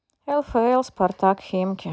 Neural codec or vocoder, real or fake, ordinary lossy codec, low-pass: none; real; none; none